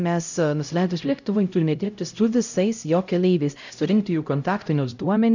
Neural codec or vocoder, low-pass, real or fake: codec, 16 kHz, 0.5 kbps, X-Codec, HuBERT features, trained on LibriSpeech; 7.2 kHz; fake